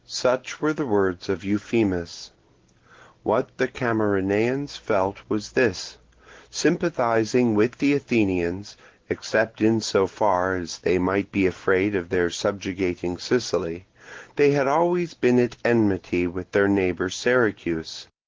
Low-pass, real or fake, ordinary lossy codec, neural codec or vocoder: 7.2 kHz; real; Opus, 16 kbps; none